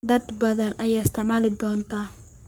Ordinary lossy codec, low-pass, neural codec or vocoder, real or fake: none; none; codec, 44.1 kHz, 3.4 kbps, Pupu-Codec; fake